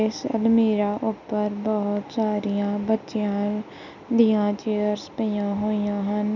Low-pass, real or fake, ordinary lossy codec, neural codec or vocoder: 7.2 kHz; real; none; none